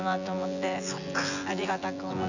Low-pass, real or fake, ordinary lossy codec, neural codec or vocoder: 7.2 kHz; fake; none; vocoder, 24 kHz, 100 mel bands, Vocos